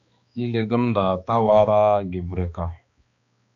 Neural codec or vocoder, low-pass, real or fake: codec, 16 kHz, 2 kbps, X-Codec, HuBERT features, trained on balanced general audio; 7.2 kHz; fake